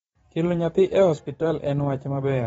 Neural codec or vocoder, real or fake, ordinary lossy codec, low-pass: vocoder, 44.1 kHz, 128 mel bands every 512 samples, BigVGAN v2; fake; AAC, 24 kbps; 19.8 kHz